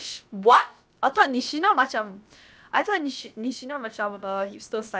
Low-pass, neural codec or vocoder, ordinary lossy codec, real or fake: none; codec, 16 kHz, about 1 kbps, DyCAST, with the encoder's durations; none; fake